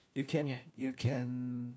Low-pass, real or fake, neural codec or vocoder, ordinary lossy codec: none; fake; codec, 16 kHz, 1 kbps, FunCodec, trained on LibriTTS, 50 frames a second; none